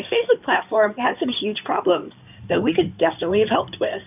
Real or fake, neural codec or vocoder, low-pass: fake; codec, 16 kHz in and 24 kHz out, 2.2 kbps, FireRedTTS-2 codec; 3.6 kHz